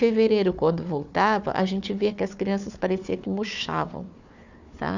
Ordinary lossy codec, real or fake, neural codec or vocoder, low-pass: none; fake; codec, 16 kHz, 4 kbps, FunCodec, trained on Chinese and English, 50 frames a second; 7.2 kHz